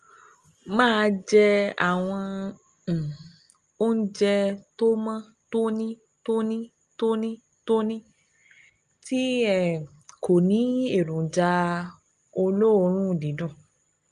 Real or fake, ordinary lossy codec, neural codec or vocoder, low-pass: real; Opus, 32 kbps; none; 9.9 kHz